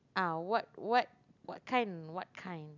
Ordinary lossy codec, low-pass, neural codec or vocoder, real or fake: none; 7.2 kHz; none; real